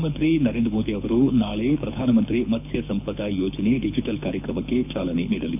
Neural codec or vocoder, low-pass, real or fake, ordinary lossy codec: codec, 24 kHz, 6 kbps, HILCodec; 3.6 kHz; fake; none